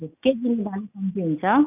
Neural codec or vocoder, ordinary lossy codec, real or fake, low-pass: none; none; real; 3.6 kHz